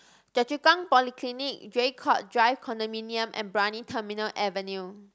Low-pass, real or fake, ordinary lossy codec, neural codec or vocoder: none; real; none; none